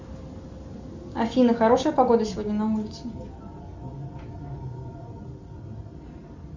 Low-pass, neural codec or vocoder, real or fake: 7.2 kHz; none; real